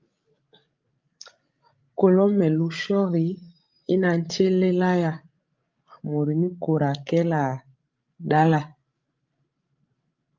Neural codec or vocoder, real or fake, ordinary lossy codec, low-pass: codec, 16 kHz, 16 kbps, FreqCodec, larger model; fake; Opus, 32 kbps; 7.2 kHz